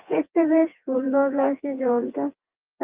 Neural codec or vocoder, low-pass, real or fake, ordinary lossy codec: vocoder, 24 kHz, 100 mel bands, Vocos; 3.6 kHz; fake; Opus, 32 kbps